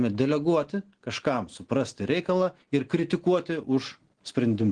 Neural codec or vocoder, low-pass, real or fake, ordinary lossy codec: none; 9.9 kHz; real; Opus, 16 kbps